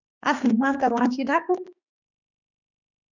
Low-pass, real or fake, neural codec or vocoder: 7.2 kHz; fake; autoencoder, 48 kHz, 32 numbers a frame, DAC-VAE, trained on Japanese speech